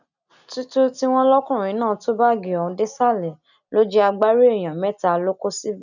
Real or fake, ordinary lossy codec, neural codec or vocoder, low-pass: real; none; none; 7.2 kHz